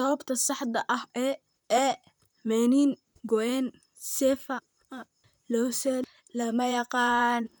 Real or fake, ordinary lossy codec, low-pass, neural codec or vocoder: fake; none; none; vocoder, 44.1 kHz, 128 mel bands, Pupu-Vocoder